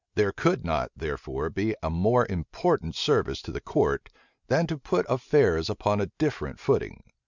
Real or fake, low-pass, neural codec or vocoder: real; 7.2 kHz; none